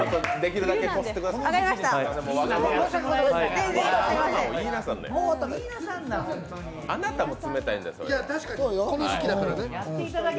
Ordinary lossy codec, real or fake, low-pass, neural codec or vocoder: none; real; none; none